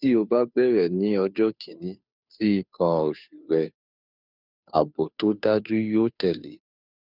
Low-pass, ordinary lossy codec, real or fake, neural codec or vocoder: 5.4 kHz; none; fake; codec, 16 kHz, 2 kbps, FunCodec, trained on Chinese and English, 25 frames a second